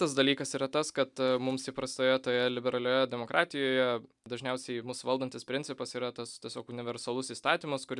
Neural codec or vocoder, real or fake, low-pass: none; real; 10.8 kHz